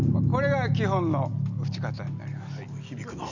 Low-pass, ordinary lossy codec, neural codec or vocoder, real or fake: 7.2 kHz; none; none; real